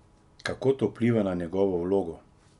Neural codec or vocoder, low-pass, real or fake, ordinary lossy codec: none; 10.8 kHz; real; none